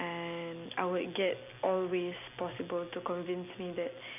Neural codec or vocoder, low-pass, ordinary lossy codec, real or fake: none; 3.6 kHz; none; real